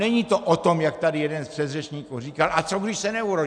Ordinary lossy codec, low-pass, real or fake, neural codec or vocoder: MP3, 96 kbps; 9.9 kHz; real; none